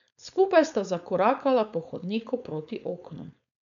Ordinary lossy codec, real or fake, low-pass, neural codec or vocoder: none; fake; 7.2 kHz; codec, 16 kHz, 4.8 kbps, FACodec